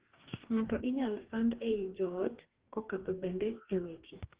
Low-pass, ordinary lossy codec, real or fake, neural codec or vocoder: 3.6 kHz; Opus, 16 kbps; fake; codec, 44.1 kHz, 2.6 kbps, DAC